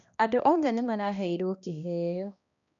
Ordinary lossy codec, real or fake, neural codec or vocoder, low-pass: none; fake; codec, 16 kHz, 1 kbps, X-Codec, HuBERT features, trained on balanced general audio; 7.2 kHz